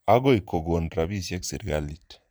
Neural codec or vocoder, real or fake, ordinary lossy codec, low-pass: vocoder, 44.1 kHz, 128 mel bands every 512 samples, BigVGAN v2; fake; none; none